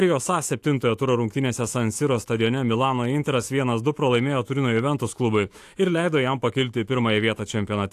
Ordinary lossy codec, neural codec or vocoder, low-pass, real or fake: AAC, 64 kbps; autoencoder, 48 kHz, 128 numbers a frame, DAC-VAE, trained on Japanese speech; 14.4 kHz; fake